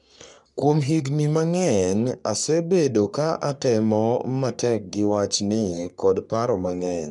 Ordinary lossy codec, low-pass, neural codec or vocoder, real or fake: none; 10.8 kHz; codec, 44.1 kHz, 3.4 kbps, Pupu-Codec; fake